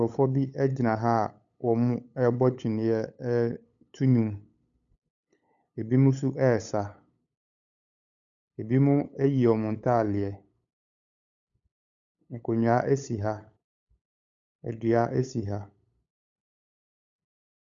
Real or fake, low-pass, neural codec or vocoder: fake; 7.2 kHz; codec, 16 kHz, 8 kbps, FunCodec, trained on LibriTTS, 25 frames a second